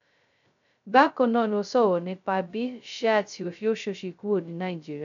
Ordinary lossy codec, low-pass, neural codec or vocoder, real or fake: none; 7.2 kHz; codec, 16 kHz, 0.2 kbps, FocalCodec; fake